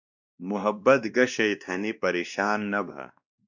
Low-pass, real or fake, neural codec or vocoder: 7.2 kHz; fake; codec, 16 kHz, 1 kbps, X-Codec, WavLM features, trained on Multilingual LibriSpeech